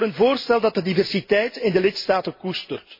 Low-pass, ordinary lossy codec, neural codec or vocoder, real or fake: 5.4 kHz; MP3, 24 kbps; none; real